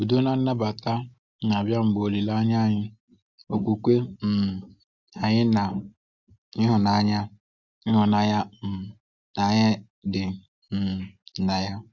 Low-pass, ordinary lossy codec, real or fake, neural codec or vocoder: 7.2 kHz; Opus, 64 kbps; real; none